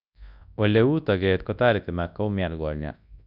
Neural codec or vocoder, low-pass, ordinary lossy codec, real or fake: codec, 24 kHz, 0.9 kbps, WavTokenizer, large speech release; 5.4 kHz; none; fake